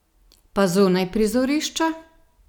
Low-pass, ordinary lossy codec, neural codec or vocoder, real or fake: 19.8 kHz; none; none; real